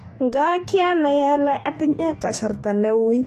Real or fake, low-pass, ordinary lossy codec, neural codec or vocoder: fake; 14.4 kHz; AAC, 64 kbps; codec, 44.1 kHz, 2.6 kbps, DAC